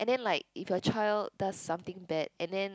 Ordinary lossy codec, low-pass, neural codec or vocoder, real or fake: none; none; none; real